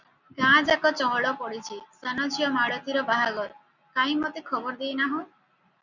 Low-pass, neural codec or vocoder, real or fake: 7.2 kHz; none; real